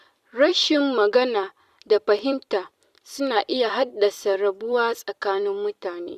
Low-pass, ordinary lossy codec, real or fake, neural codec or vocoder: 14.4 kHz; Opus, 64 kbps; fake; vocoder, 44.1 kHz, 128 mel bands every 256 samples, BigVGAN v2